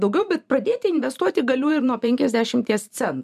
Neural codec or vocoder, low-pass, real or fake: vocoder, 44.1 kHz, 128 mel bands every 512 samples, BigVGAN v2; 14.4 kHz; fake